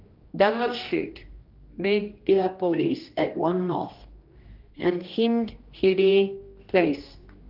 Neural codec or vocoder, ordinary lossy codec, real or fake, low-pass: codec, 16 kHz, 1 kbps, X-Codec, HuBERT features, trained on general audio; Opus, 32 kbps; fake; 5.4 kHz